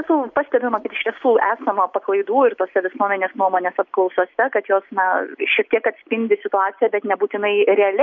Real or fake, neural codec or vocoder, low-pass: real; none; 7.2 kHz